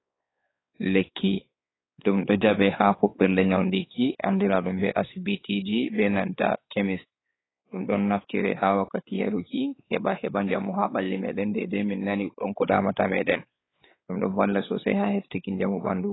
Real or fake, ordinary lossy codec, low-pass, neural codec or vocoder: fake; AAC, 16 kbps; 7.2 kHz; codec, 16 kHz, 4 kbps, X-Codec, WavLM features, trained on Multilingual LibriSpeech